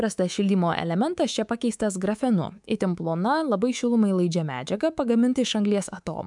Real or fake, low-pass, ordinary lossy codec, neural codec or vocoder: fake; 10.8 kHz; MP3, 96 kbps; codec, 24 kHz, 3.1 kbps, DualCodec